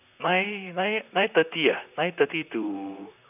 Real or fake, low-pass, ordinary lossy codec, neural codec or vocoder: fake; 3.6 kHz; none; vocoder, 44.1 kHz, 128 mel bands, Pupu-Vocoder